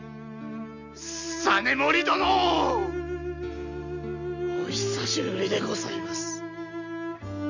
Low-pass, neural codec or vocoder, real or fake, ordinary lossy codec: 7.2 kHz; none; real; none